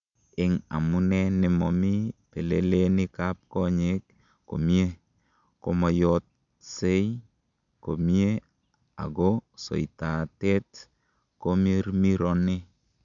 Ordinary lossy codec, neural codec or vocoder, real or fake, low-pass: none; none; real; 7.2 kHz